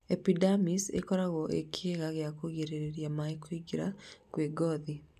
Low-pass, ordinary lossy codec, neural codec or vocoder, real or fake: 14.4 kHz; none; none; real